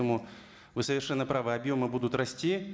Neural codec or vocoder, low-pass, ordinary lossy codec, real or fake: none; none; none; real